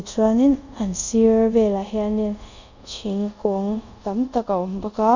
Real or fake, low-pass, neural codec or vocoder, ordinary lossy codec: fake; 7.2 kHz; codec, 24 kHz, 0.5 kbps, DualCodec; none